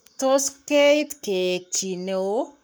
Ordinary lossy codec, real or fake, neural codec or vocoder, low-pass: none; fake; codec, 44.1 kHz, 7.8 kbps, Pupu-Codec; none